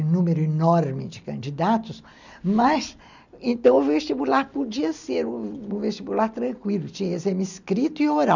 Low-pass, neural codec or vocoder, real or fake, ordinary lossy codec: 7.2 kHz; none; real; none